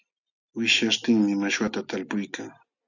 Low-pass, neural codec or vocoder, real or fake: 7.2 kHz; none; real